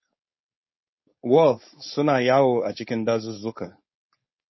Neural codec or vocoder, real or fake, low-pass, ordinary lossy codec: codec, 16 kHz, 4.8 kbps, FACodec; fake; 7.2 kHz; MP3, 24 kbps